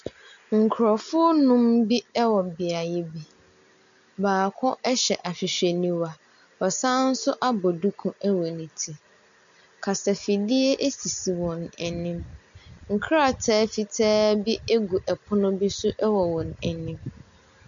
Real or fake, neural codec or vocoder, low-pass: real; none; 7.2 kHz